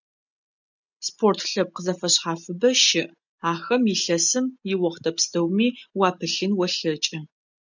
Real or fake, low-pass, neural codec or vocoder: real; 7.2 kHz; none